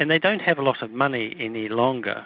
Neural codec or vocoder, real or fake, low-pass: none; real; 5.4 kHz